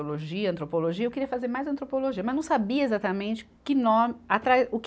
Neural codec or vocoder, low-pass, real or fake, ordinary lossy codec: none; none; real; none